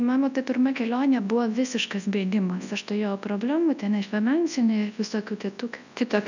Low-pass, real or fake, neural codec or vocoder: 7.2 kHz; fake; codec, 24 kHz, 0.9 kbps, WavTokenizer, large speech release